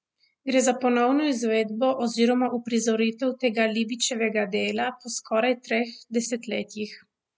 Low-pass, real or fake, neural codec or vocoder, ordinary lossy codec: none; real; none; none